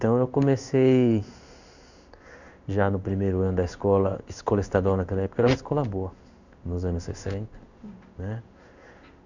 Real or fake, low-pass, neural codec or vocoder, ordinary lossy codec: fake; 7.2 kHz; codec, 16 kHz in and 24 kHz out, 1 kbps, XY-Tokenizer; none